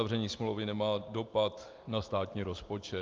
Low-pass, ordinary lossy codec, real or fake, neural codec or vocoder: 7.2 kHz; Opus, 32 kbps; real; none